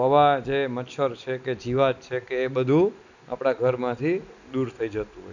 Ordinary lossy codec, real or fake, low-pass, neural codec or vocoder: none; real; 7.2 kHz; none